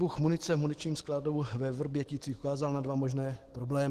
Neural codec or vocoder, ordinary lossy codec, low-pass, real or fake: autoencoder, 48 kHz, 128 numbers a frame, DAC-VAE, trained on Japanese speech; Opus, 16 kbps; 14.4 kHz; fake